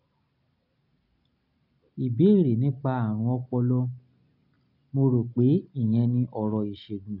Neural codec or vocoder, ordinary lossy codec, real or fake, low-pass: none; none; real; 5.4 kHz